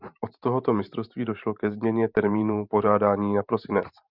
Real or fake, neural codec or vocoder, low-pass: fake; vocoder, 44.1 kHz, 128 mel bands every 512 samples, BigVGAN v2; 5.4 kHz